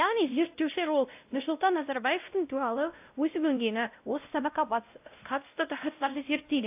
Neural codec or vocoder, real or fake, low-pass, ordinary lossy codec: codec, 16 kHz, 0.5 kbps, X-Codec, WavLM features, trained on Multilingual LibriSpeech; fake; 3.6 kHz; AAC, 32 kbps